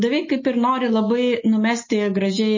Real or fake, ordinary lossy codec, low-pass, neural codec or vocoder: real; MP3, 32 kbps; 7.2 kHz; none